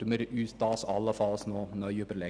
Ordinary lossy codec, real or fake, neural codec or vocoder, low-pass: none; real; none; 9.9 kHz